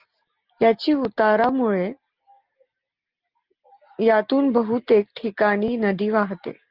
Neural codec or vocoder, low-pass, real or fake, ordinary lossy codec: none; 5.4 kHz; real; Opus, 32 kbps